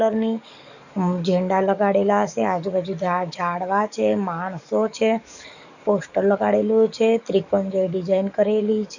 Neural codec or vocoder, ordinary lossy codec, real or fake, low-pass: codec, 44.1 kHz, 7.8 kbps, DAC; none; fake; 7.2 kHz